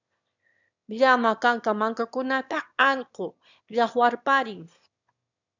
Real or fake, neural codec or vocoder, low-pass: fake; autoencoder, 22.05 kHz, a latent of 192 numbers a frame, VITS, trained on one speaker; 7.2 kHz